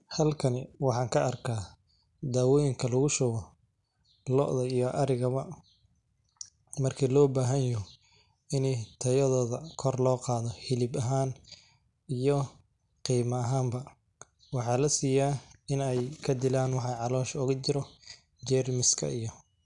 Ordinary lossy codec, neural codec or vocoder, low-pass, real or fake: none; none; 10.8 kHz; real